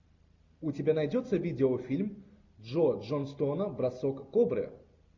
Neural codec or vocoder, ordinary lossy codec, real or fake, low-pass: none; MP3, 64 kbps; real; 7.2 kHz